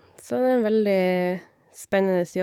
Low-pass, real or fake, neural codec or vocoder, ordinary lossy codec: 19.8 kHz; fake; codec, 44.1 kHz, 7.8 kbps, DAC; none